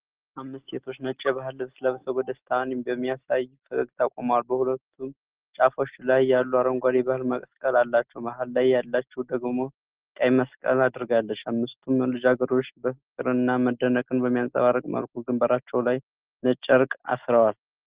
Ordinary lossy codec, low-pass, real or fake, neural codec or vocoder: Opus, 16 kbps; 3.6 kHz; real; none